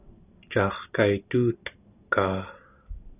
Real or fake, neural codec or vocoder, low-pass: real; none; 3.6 kHz